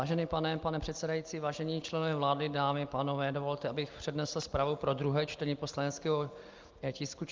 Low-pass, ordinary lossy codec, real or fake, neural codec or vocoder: 7.2 kHz; Opus, 24 kbps; real; none